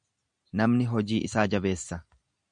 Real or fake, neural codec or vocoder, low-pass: real; none; 9.9 kHz